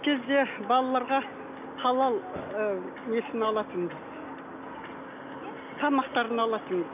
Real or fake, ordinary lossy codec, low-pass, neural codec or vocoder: real; none; 3.6 kHz; none